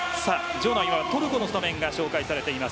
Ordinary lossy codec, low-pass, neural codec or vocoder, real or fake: none; none; none; real